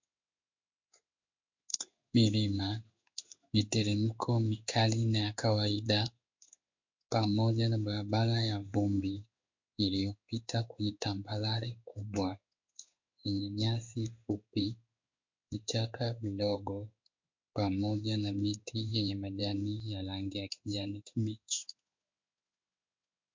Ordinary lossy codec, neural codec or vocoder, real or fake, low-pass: MP3, 48 kbps; codec, 16 kHz in and 24 kHz out, 1 kbps, XY-Tokenizer; fake; 7.2 kHz